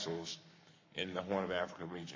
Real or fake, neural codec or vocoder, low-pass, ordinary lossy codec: fake; codec, 44.1 kHz, 7.8 kbps, Pupu-Codec; 7.2 kHz; MP3, 32 kbps